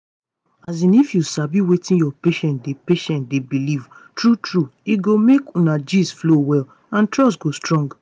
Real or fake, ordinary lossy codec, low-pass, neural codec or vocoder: real; none; 9.9 kHz; none